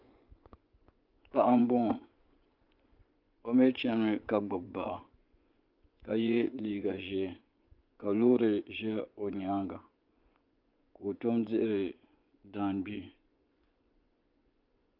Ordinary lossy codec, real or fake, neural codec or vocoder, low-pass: Opus, 32 kbps; fake; vocoder, 24 kHz, 100 mel bands, Vocos; 5.4 kHz